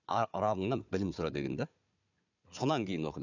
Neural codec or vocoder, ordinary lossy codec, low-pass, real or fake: codec, 16 kHz, 4 kbps, FunCodec, trained on Chinese and English, 50 frames a second; none; 7.2 kHz; fake